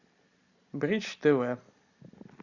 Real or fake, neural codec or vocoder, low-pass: real; none; 7.2 kHz